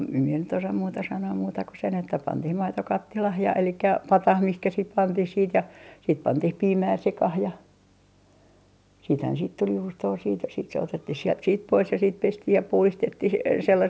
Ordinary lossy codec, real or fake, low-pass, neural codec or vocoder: none; real; none; none